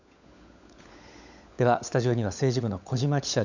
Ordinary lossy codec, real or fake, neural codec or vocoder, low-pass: none; fake; codec, 16 kHz, 16 kbps, FunCodec, trained on LibriTTS, 50 frames a second; 7.2 kHz